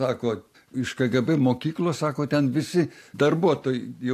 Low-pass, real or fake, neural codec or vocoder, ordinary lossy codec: 14.4 kHz; real; none; AAC, 64 kbps